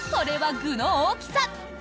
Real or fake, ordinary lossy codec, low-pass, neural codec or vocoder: real; none; none; none